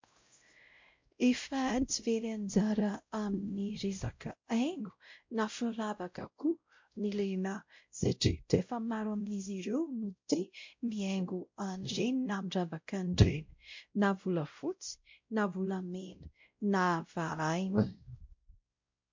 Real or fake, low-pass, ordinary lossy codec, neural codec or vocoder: fake; 7.2 kHz; MP3, 48 kbps; codec, 16 kHz, 0.5 kbps, X-Codec, WavLM features, trained on Multilingual LibriSpeech